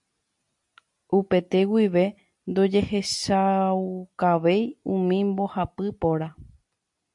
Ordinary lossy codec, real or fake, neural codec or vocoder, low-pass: MP3, 64 kbps; real; none; 10.8 kHz